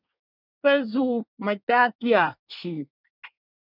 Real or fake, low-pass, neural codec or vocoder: fake; 5.4 kHz; codec, 24 kHz, 1 kbps, SNAC